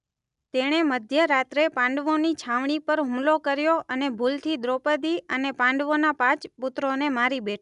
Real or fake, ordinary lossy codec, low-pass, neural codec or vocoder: real; none; 10.8 kHz; none